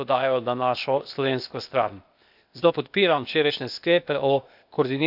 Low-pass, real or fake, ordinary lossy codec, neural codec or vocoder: 5.4 kHz; fake; none; codec, 16 kHz, 0.8 kbps, ZipCodec